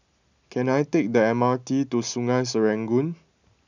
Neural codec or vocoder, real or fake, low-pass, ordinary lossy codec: none; real; 7.2 kHz; none